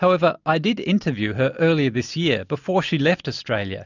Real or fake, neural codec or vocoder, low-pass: real; none; 7.2 kHz